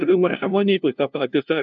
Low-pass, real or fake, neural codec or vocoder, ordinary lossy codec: 7.2 kHz; fake; codec, 16 kHz, 0.5 kbps, FunCodec, trained on LibriTTS, 25 frames a second; AAC, 64 kbps